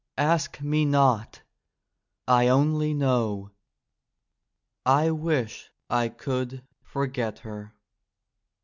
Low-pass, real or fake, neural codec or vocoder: 7.2 kHz; real; none